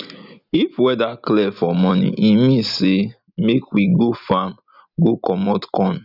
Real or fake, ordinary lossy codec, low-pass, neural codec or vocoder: real; none; 5.4 kHz; none